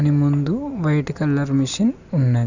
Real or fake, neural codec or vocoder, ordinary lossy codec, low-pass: real; none; none; 7.2 kHz